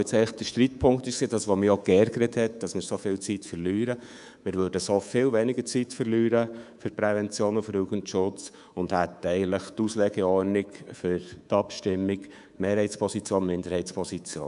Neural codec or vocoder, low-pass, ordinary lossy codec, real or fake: codec, 24 kHz, 3.1 kbps, DualCodec; 10.8 kHz; none; fake